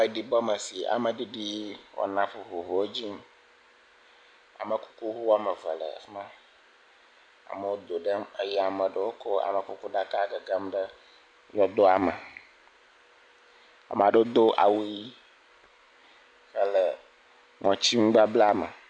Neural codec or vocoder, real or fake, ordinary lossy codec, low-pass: none; real; AAC, 64 kbps; 9.9 kHz